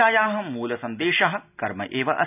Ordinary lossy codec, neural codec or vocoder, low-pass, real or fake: none; none; 3.6 kHz; real